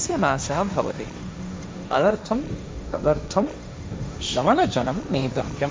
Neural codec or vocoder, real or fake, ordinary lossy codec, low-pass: codec, 16 kHz, 1.1 kbps, Voila-Tokenizer; fake; none; none